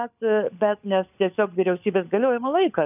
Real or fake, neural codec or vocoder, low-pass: fake; codec, 16 kHz, 16 kbps, FunCodec, trained on LibriTTS, 50 frames a second; 3.6 kHz